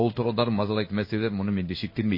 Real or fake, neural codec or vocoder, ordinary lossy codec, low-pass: fake; codec, 16 kHz in and 24 kHz out, 1 kbps, XY-Tokenizer; MP3, 24 kbps; 5.4 kHz